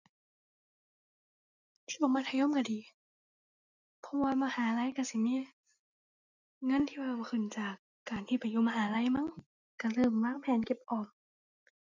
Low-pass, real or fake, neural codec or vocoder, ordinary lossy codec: 7.2 kHz; real; none; none